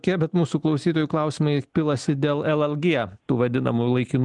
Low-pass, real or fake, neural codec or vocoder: 10.8 kHz; real; none